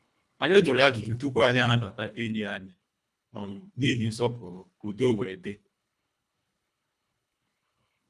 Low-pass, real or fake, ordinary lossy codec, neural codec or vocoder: none; fake; none; codec, 24 kHz, 1.5 kbps, HILCodec